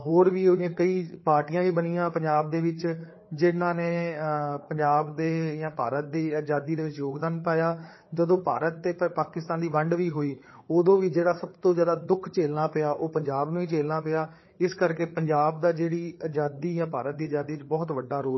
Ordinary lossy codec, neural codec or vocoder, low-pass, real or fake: MP3, 24 kbps; codec, 16 kHz, 4 kbps, FreqCodec, larger model; 7.2 kHz; fake